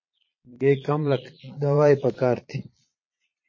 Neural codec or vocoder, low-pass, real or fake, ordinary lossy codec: vocoder, 22.05 kHz, 80 mel bands, Vocos; 7.2 kHz; fake; MP3, 32 kbps